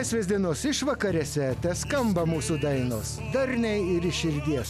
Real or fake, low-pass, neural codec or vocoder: real; 14.4 kHz; none